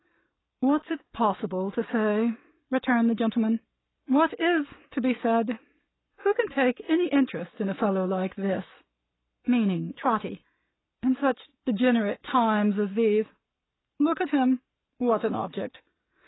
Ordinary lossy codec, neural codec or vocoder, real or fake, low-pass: AAC, 16 kbps; codec, 44.1 kHz, 7.8 kbps, Pupu-Codec; fake; 7.2 kHz